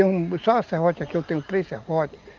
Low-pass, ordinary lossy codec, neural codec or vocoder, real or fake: 7.2 kHz; Opus, 32 kbps; none; real